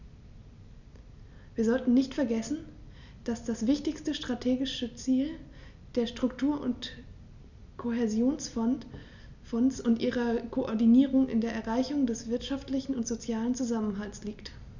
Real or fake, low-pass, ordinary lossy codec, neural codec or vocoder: real; 7.2 kHz; none; none